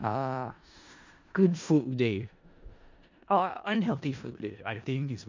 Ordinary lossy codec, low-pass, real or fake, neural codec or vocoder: MP3, 64 kbps; 7.2 kHz; fake; codec, 16 kHz in and 24 kHz out, 0.4 kbps, LongCat-Audio-Codec, four codebook decoder